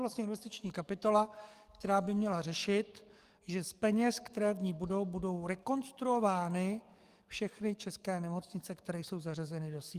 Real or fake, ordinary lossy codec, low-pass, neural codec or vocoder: fake; Opus, 32 kbps; 14.4 kHz; codec, 44.1 kHz, 7.8 kbps, DAC